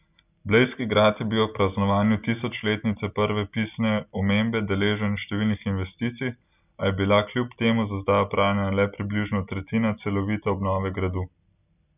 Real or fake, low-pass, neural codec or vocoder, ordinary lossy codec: real; 3.6 kHz; none; none